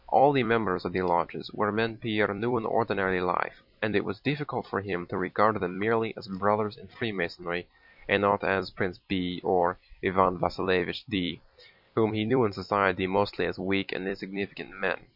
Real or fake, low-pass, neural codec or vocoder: fake; 5.4 kHz; vocoder, 44.1 kHz, 128 mel bands every 256 samples, BigVGAN v2